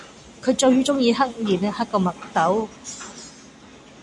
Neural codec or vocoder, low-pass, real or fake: none; 10.8 kHz; real